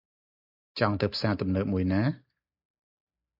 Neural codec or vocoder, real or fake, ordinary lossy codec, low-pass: none; real; MP3, 48 kbps; 5.4 kHz